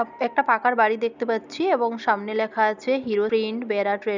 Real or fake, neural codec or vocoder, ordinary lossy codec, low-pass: real; none; none; 7.2 kHz